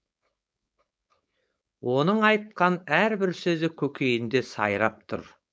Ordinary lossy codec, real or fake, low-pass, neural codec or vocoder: none; fake; none; codec, 16 kHz, 4.8 kbps, FACodec